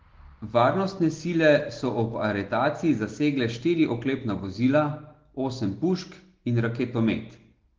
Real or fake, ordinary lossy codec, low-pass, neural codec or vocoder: real; Opus, 16 kbps; 7.2 kHz; none